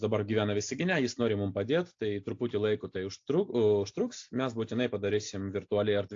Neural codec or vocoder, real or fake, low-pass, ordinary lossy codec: none; real; 7.2 kHz; AAC, 48 kbps